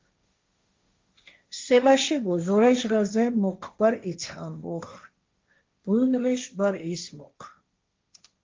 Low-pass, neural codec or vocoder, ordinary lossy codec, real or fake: 7.2 kHz; codec, 16 kHz, 1.1 kbps, Voila-Tokenizer; Opus, 32 kbps; fake